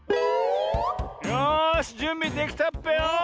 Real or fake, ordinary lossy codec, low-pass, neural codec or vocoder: real; none; none; none